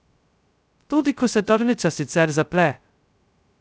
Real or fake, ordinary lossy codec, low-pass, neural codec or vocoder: fake; none; none; codec, 16 kHz, 0.2 kbps, FocalCodec